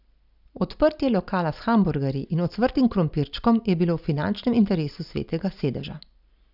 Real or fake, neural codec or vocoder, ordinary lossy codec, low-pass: real; none; none; 5.4 kHz